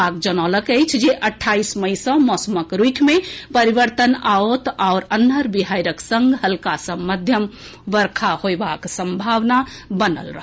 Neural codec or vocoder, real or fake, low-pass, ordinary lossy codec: none; real; none; none